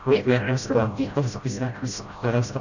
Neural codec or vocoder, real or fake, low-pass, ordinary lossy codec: codec, 16 kHz, 0.5 kbps, FreqCodec, smaller model; fake; 7.2 kHz; none